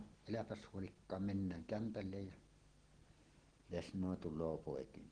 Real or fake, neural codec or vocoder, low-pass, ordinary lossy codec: real; none; 9.9 kHz; Opus, 16 kbps